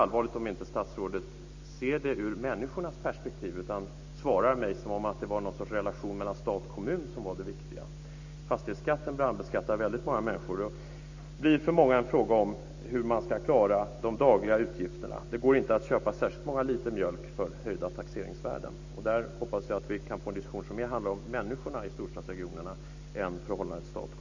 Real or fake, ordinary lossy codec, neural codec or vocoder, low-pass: real; AAC, 48 kbps; none; 7.2 kHz